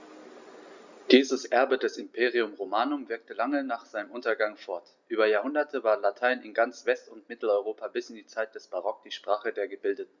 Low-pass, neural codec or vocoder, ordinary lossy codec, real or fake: 7.2 kHz; none; Opus, 64 kbps; real